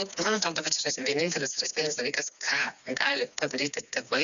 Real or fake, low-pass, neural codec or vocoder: fake; 7.2 kHz; codec, 16 kHz, 2 kbps, FreqCodec, smaller model